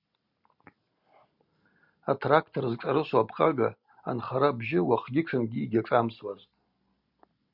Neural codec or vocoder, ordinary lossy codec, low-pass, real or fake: none; Opus, 64 kbps; 5.4 kHz; real